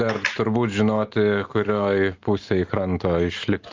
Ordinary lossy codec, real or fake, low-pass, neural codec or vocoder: Opus, 32 kbps; real; 7.2 kHz; none